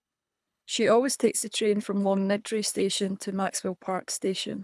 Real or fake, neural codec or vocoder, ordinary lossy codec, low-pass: fake; codec, 24 kHz, 3 kbps, HILCodec; none; none